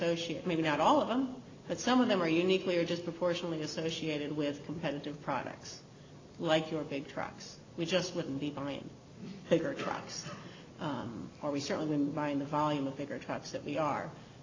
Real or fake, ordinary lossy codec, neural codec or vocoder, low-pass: real; AAC, 32 kbps; none; 7.2 kHz